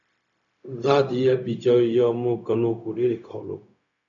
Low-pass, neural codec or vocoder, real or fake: 7.2 kHz; codec, 16 kHz, 0.4 kbps, LongCat-Audio-Codec; fake